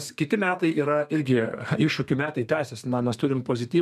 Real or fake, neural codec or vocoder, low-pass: fake; codec, 32 kHz, 1.9 kbps, SNAC; 14.4 kHz